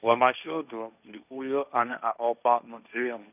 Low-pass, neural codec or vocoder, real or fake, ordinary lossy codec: 3.6 kHz; codec, 16 kHz, 1.1 kbps, Voila-Tokenizer; fake; none